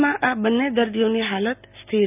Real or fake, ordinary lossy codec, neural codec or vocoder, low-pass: real; none; none; 3.6 kHz